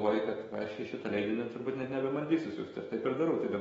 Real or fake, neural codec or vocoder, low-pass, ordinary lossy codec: fake; autoencoder, 48 kHz, 128 numbers a frame, DAC-VAE, trained on Japanese speech; 19.8 kHz; AAC, 24 kbps